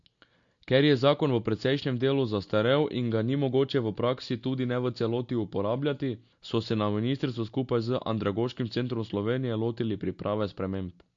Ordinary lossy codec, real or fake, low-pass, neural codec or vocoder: MP3, 48 kbps; real; 7.2 kHz; none